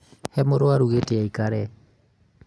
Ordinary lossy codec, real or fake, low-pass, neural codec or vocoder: none; real; none; none